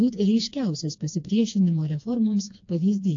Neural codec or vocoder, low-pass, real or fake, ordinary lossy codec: codec, 16 kHz, 2 kbps, FreqCodec, smaller model; 7.2 kHz; fake; AAC, 48 kbps